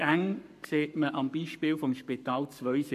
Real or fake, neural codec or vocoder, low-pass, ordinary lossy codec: fake; codec, 44.1 kHz, 7.8 kbps, Pupu-Codec; 14.4 kHz; none